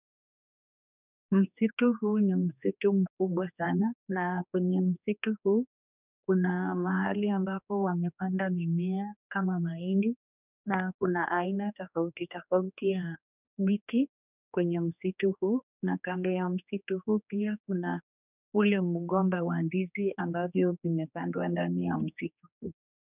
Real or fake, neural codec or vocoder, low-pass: fake; codec, 16 kHz, 2 kbps, X-Codec, HuBERT features, trained on general audio; 3.6 kHz